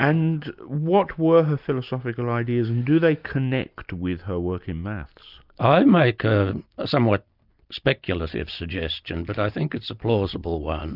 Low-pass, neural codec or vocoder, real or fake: 5.4 kHz; none; real